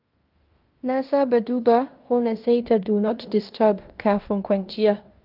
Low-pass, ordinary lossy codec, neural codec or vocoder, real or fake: 5.4 kHz; Opus, 24 kbps; codec, 16 kHz in and 24 kHz out, 0.9 kbps, LongCat-Audio-Codec, fine tuned four codebook decoder; fake